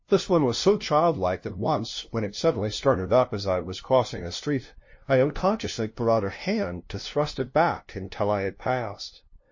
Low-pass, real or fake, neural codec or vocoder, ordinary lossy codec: 7.2 kHz; fake; codec, 16 kHz, 0.5 kbps, FunCodec, trained on LibriTTS, 25 frames a second; MP3, 32 kbps